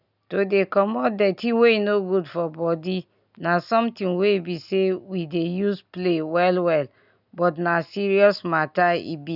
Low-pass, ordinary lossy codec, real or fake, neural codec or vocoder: 5.4 kHz; none; real; none